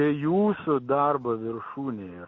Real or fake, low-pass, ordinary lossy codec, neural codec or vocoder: real; 7.2 kHz; MP3, 32 kbps; none